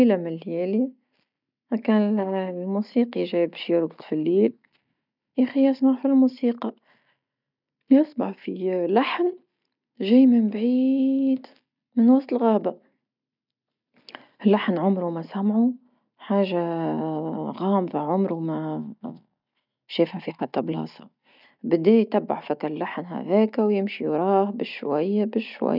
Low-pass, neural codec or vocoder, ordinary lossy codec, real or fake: 5.4 kHz; none; none; real